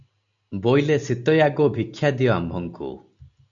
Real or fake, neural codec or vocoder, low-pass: real; none; 7.2 kHz